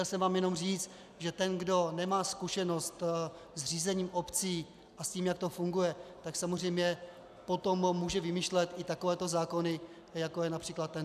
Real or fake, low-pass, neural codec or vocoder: real; 14.4 kHz; none